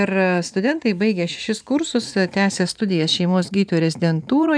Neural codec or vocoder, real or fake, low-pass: vocoder, 44.1 kHz, 128 mel bands every 512 samples, BigVGAN v2; fake; 9.9 kHz